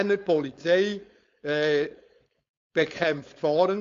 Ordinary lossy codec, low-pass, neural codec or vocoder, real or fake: AAC, 48 kbps; 7.2 kHz; codec, 16 kHz, 4.8 kbps, FACodec; fake